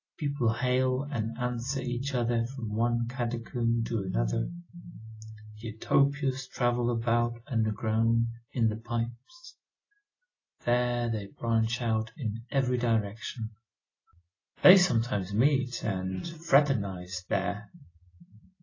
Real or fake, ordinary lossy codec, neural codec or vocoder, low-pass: real; AAC, 32 kbps; none; 7.2 kHz